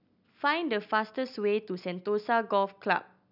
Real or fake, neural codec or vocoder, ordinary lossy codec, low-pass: real; none; none; 5.4 kHz